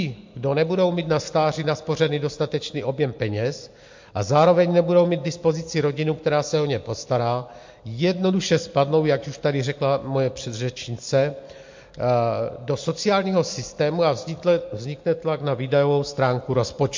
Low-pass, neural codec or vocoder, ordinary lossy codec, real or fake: 7.2 kHz; none; MP3, 48 kbps; real